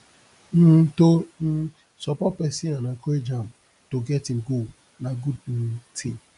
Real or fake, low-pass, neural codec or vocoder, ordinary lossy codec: real; 10.8 kHz; none; none